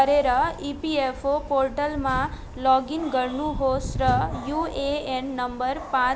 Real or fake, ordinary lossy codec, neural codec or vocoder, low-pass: real; none; none; none